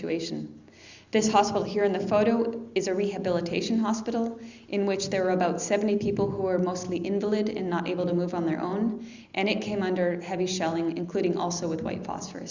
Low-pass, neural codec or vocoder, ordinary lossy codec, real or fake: 7.2 kHz; none; Opus, 64 kbps; real